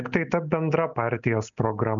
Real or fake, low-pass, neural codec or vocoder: real; 7.2 kHz; none